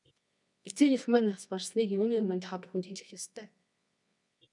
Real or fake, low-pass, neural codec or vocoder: fake; 10.8 kHz; codec, 24 kHz, 0.9 kbps, WavTokenizer, medium music audio release